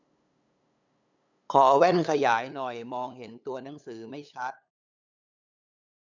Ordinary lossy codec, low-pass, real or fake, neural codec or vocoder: none; 7.2 kHz; fake; codec, 16 kHz, 8 kbps, FunCodec, trained on LibriTTS, 25 frames a second